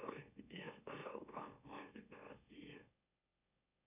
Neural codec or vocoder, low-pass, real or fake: autoencoder, 44.1 kHz, a latent of 192 numbers a frame, MeloTTS; 3.6 kHz; fake